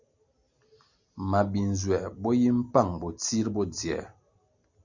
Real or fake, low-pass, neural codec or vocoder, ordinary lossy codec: real; 7.2 kHz; none; Opus, 64 kbps